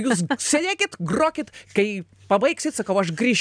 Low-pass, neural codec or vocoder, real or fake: 9.9 kHz; none; real